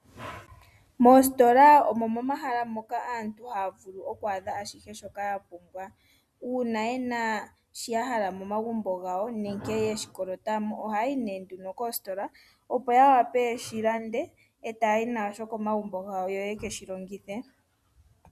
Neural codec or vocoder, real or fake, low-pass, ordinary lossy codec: none; real; 14.4 kHz; Opus, 64 kbps